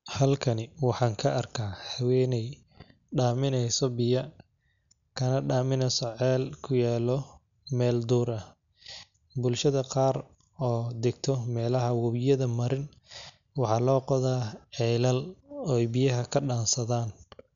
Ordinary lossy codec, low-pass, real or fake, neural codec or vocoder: none; 7.2 kHz; real; none